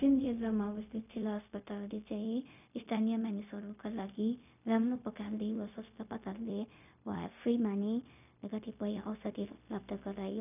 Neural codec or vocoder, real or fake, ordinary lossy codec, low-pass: codec, 16 kHz, 0.4 kbps, LongCat-Audio-Codec; fake; none; 3.6 kHz